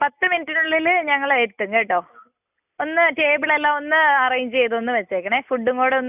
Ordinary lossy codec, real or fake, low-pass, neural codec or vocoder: none; real; 3.6 kHz; none